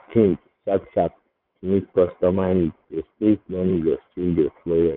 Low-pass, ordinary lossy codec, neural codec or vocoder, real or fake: 5.4 kHz; none; vocoder, 22.05 kHz, 80 mel bands, WaveNeXt; fake